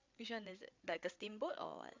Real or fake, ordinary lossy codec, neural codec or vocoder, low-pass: fake; MP3, 64 kbps; codec, 16 kHz, 8 kbps, FreqCodec, larger model; 7.2 kHz